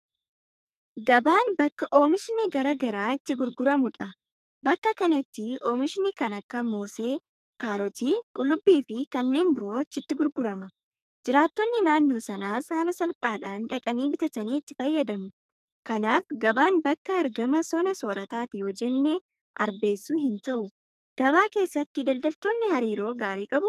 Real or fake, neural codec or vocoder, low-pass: fake; codec, 44.1 kHz, 2.6 kbps, SNAC; 14.4 kHz